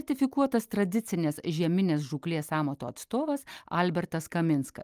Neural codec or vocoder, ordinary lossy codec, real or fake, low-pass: none; Opus, 32 kbps; real; 14.4 kHz